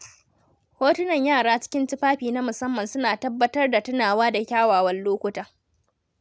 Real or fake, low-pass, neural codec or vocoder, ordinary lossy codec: real; none; none; none